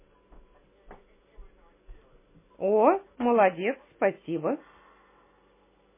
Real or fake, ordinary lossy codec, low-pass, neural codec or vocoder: real; MP3, 16 kbps; 3.6 kHz; none